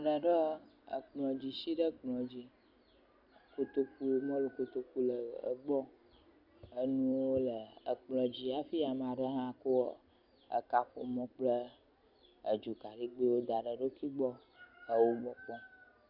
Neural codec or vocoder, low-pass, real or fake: none; 5.4 kHz; real